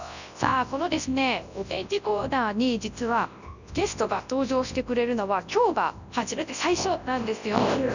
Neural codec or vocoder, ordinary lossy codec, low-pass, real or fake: codec, 24 kHz, 0.9 kbps, WavTokenizer, large speech release; none; 7.2 kHz; fake